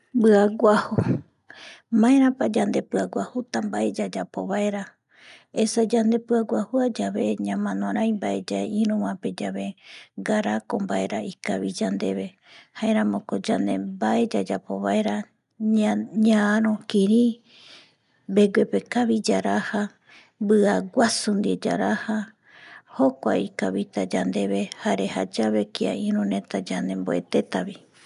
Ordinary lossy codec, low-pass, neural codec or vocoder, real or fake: none; 10.8 kHz; none; real